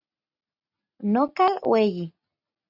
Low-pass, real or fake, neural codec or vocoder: 5.4 kHz; real; none